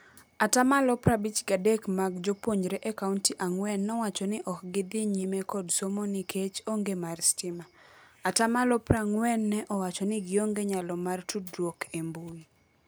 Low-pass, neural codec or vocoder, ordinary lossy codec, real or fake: none; none; none; real